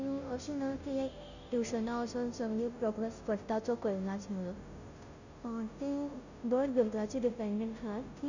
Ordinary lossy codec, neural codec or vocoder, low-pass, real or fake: none; codec, 16 kHz, 0.5 kbps, FunCodec, trained on Chinese and English, 25 frames a second; 7.2 kHz; fake